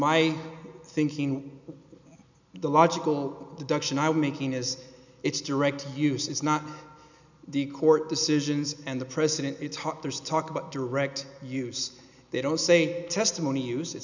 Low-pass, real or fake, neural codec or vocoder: 7.2 kHz; real; none